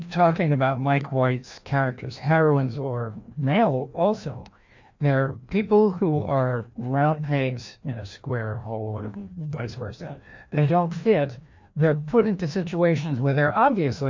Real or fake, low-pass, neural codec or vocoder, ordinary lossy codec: fake; 7.2 kHz; codec, 16 kHz, 1 kbps, FreqCodec, larger model; MP3, 48 kbps